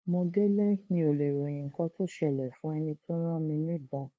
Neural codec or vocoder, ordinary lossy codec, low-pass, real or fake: codec, 16 kHz, 2 kbps, FunCodec, trained on LibriTTS, 25 frames a second; none; none; fake